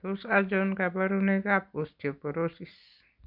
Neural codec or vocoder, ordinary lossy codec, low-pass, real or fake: none; none; 5.4 kHz; real